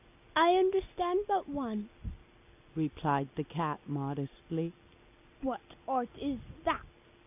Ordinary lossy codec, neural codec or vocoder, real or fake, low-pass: AAC, 32 kbps; none; real; 3.6 kHz